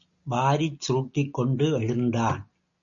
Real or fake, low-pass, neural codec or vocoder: real; 7.2 kHz; none